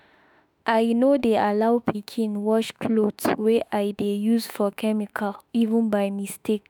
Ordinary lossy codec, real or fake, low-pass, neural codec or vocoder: none; fake; none; autoencoder, 48 kHz, 32 numbers a frame, DAC-VAE, trained on Japanese speech